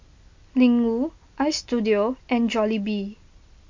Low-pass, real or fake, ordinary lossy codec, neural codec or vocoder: 7.2 kHz; real; MP3, 48 kbps; none